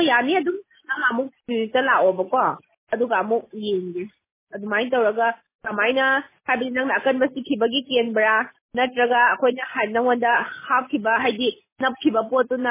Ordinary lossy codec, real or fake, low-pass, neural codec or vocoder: MP3, 16 kbps; real; 3.6 kHz; none